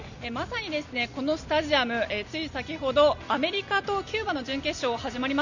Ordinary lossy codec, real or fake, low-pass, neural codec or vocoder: none; real; 7.2 kHz; none